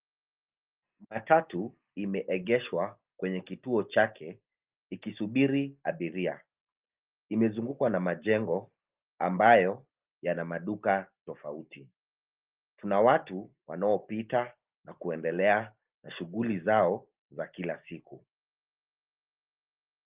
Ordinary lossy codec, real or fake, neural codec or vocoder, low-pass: Opus, 24 kbps; real; none; 3.6 kHz